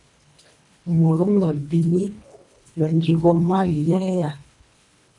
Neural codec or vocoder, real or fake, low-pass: codec, 24 kHz, 1.5 kbps, HILCodec; fake; 10.8 kHz